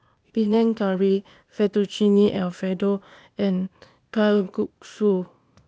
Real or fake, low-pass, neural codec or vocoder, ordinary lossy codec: fake; none; codec, 16 kHz, 0.8 kbps, ZipCodec; none